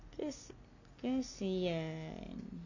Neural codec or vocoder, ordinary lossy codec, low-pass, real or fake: none; none; 7.2 kHz; real